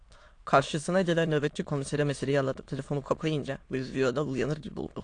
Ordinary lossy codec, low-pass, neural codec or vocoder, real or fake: AAC, 48 kbps; 9.9 kHz; autoencoder, 22.05 kHz, a latent of 192 numbers a frame, VITS, trained on many speakers; fake